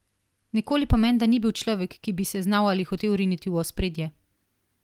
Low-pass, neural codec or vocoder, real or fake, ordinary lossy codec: 19.8 kHz; vocoder, 44.1 kHz, 128 mel bands every 256 samples, BigVGAN v2; fake; Opus, 24 kbps